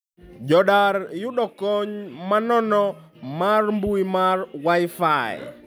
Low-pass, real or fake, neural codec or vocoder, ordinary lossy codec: none; real; none; none